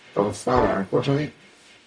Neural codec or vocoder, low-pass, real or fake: codec, 44.1 kHz, 0.9 kbps, DAC; 9.9 kHz; fake